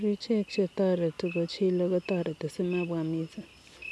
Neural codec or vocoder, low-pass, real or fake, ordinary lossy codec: none; none; real; none